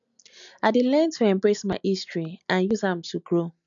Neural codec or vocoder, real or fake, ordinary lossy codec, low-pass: none; real; none; 7.2 kHz